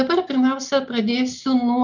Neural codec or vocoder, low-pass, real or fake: none; 7.2 kHz; real